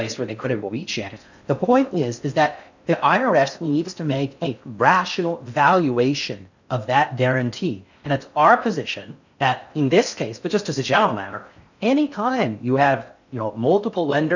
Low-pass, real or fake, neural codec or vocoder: 7.2 kHz; fake; codec, 16 kHz in and 24 kHz out, 0.6 kbps, FocalCodec, streaming, 4096 codes